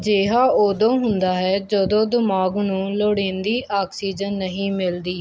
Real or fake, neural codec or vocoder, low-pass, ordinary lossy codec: real; none; none; none